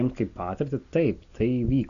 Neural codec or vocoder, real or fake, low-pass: none; real; 7.2 kHz